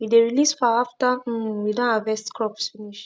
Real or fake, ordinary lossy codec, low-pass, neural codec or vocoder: real; none; none; none